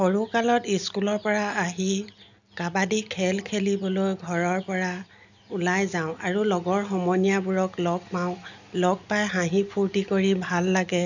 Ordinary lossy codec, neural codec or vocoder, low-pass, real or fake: none; none; 7.2 kHz; real